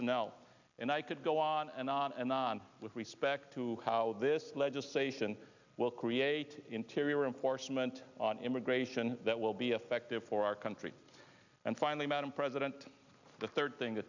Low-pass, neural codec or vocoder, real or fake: 7.2 kHz; none; real